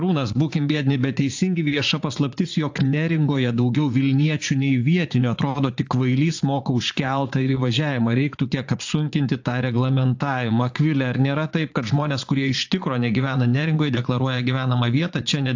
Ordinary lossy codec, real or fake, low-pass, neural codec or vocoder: AAC, 48 kbps; fake; 7.2 kHz; vocoder, 44.1 kHz, 80 mel bands, Vocos